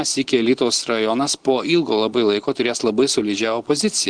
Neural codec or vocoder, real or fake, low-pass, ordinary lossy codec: none; real; 9.9 kHz; Opus, 16 kbps